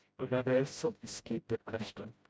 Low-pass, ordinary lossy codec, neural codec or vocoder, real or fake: none; none; codec, 16 kHz, 0.5 kbps, FreqCodec, smaller model; fake